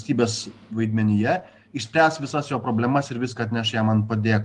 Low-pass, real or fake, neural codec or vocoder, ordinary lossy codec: 10.8 kHz; real; none; Opus, 24 kbps